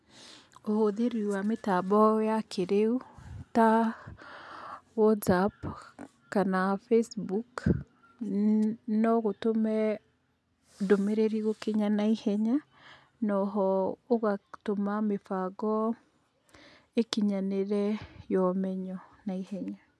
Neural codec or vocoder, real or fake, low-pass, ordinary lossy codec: none; real; none; none